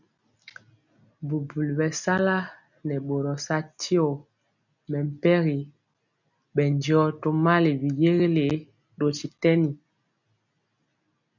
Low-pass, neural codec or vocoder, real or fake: 7.2 kHz; none; real